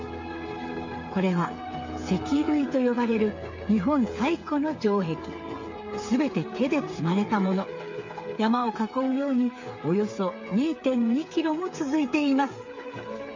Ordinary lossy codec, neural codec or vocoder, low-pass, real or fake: MP3, 48 kbps; codec, 16 kHz, 8 kbps, FreqCodec, smaller model; 7.2 kHz; fake